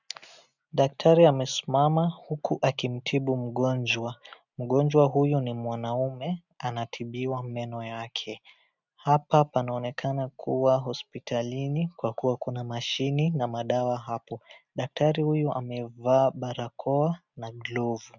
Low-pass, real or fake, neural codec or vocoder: 7.2 kHz; real; none